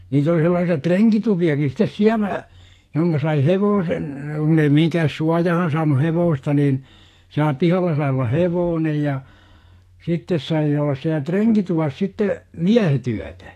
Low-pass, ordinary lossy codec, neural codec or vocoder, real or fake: 14.4 kHz; AAC, 64 kbps; codec, 44.1 kHz, 2.6 kbps, SNAC; fake